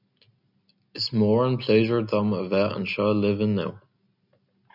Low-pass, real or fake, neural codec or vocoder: 5.4 kHz; real; none